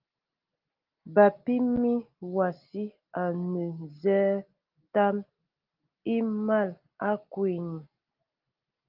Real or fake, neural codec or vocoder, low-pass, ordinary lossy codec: real; none; 5.4 kHz; Opus, 32 kbps